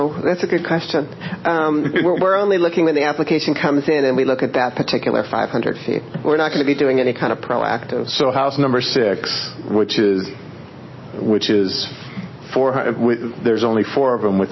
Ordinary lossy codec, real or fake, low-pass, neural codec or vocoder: MP3, 24 kbps; real; 7.2 kHz; none